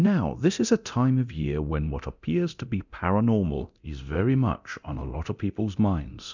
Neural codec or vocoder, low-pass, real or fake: codec, 24 kHz, 0.9 kbps, DualCodec; 7.2 kHz; fake